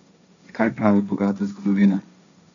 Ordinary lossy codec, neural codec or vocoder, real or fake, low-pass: none; codec, 16 kHz, 1.1 kbps, Voila-Tokenizer; fake; 7.2 kHz